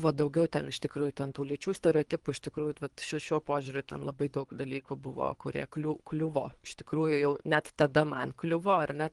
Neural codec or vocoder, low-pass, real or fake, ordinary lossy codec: codec, 24 kHz, 3 kbps, HILCodec; 10.8 kHz; fake; Opus, 24 kbps